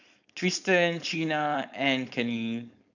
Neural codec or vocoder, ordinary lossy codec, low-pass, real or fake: codec, 16 kHz, 4.8 kbps, FACodec; none; 7.2 kHz; fake